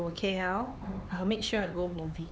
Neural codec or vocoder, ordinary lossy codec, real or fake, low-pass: codec, 16 kHz, 4 kbps, X-Codec, HuBERT features, trained on LibriSpeech; none; fake; none